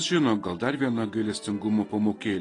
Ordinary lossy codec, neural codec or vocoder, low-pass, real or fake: AAC, 32 kbps; none; 10.8 kHz; real